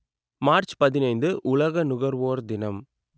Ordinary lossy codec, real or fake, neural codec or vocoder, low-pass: none; real; none; none